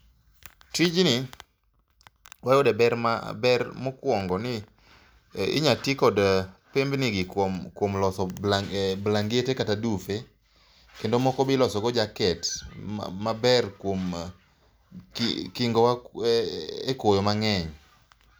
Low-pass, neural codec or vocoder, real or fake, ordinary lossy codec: none; none; real; none